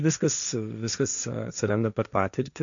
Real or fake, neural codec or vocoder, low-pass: fake; codec, 16 kHz, 1.1 kbps, Voila-Tokenizer; 7.2 kHz